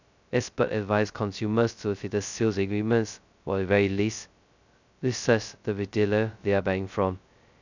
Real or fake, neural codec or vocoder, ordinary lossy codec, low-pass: fake; codec, 16 kHz, 0.2 kbps, FocalCodec; none; 7.2 kHz